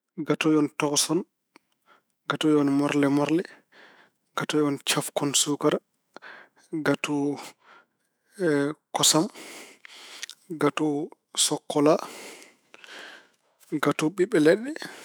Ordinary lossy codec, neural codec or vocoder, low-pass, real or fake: none; autoencoder, 48 kHz, 128 numbers a frame, DAC-VAE, trained on Japanese speech; none; fake